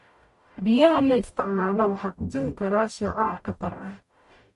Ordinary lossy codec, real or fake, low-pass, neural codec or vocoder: MP3, 48 kbps; fake; 14.4 kHz; codec, 44.1 kHz, 0.9 kbps, DAC